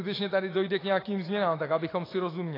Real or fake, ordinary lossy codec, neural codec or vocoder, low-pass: fake; AAC, 24 kbps; autoencoder, 48 kHz, 128 numbers a frame, DAC-VAE, trained on Japanese speech; 5.4 kHz